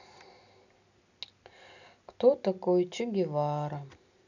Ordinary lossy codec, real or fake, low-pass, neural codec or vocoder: none; real; 7.2 kHz; none